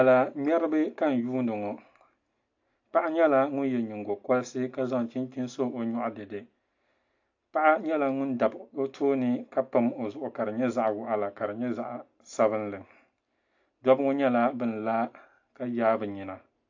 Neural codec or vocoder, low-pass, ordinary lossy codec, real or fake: none; 7.2 kHz; AAC, 48 kbps; real